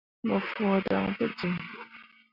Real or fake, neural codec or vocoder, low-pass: real; none; 5.4 kHz